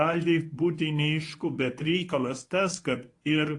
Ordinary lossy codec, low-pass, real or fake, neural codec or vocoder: AAC, 48 kbps; 10.8 kHz; fake; codec, 24 kHz, 0.9 kbps, WavTokenizer, medium speech release version 1